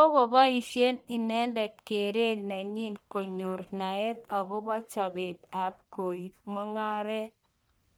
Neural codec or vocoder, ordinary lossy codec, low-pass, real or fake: codec, 44.1 kHz, 1.7 kbps, Pupu-Codec; none; none; fake